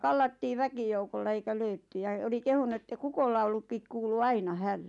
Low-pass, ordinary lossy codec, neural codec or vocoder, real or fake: 10.8 kHz; none; none; real